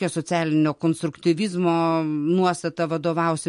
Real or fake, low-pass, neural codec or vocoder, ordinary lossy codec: real; 14.4 kHz; none; MP3, 48 kbps